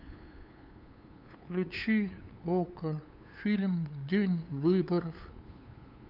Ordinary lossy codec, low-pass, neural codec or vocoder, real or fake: none; 5.4 kHz; codec, 16 kHz, 8 kbps, FunCodec, trained on LibriTTS, 25 frames a second; fake